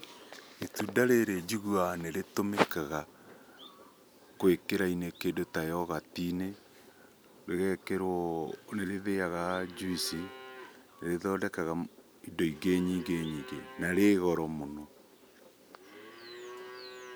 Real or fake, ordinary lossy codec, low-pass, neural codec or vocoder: real; none; none; none